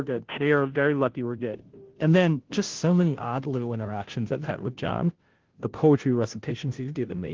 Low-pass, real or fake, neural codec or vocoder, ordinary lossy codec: 7.2 kHz; fake; codec, 16 kHz, 0.5 kbps, FunCodec, trained on Chinese and English, 25 frames a second; Opus, 16 kbps